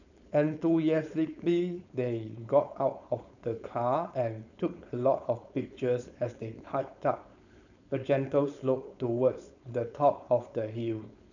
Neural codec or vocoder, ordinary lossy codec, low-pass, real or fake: codec, 16 kHz, 4.8 kbps, FACodec; none; 7.2 kHz; fake